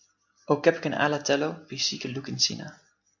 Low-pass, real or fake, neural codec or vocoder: 7.2 kHz; real; none